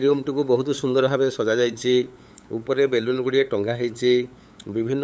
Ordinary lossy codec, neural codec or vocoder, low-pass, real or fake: none; codec, 16 kHz, 8 kbps, FunCodec, trained on LibriTTS, 25 frames a second; none; fake